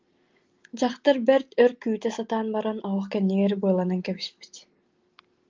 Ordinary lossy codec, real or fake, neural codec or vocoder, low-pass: Opus, 24 kbps; real; none; 7.2 kHz